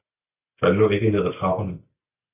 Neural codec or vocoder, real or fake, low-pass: none; real; 3.6 kHz